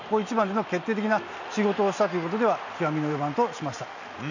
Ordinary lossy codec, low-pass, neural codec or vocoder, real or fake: none; 7.2 kHz; none; real